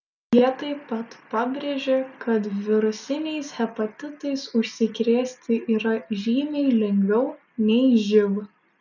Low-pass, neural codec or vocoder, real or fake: 7.2 kHz; none; real